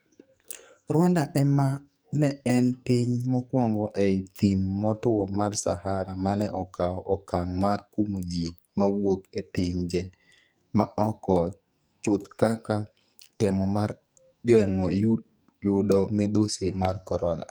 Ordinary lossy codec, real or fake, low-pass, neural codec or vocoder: none; fake; none; codec, 44.1 kHz, 2.6 kbps, SNAC